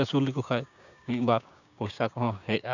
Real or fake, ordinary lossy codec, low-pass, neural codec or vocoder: fake; none; 7.2 kHz; codec, 16 kHz, 6 kbps, DAC